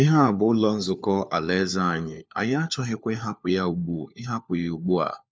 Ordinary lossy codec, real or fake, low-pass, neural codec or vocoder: none; fake; none; codec, 16 kHz, 4 kbps, FunCodec, trained on LibriTTS, 50 frames a second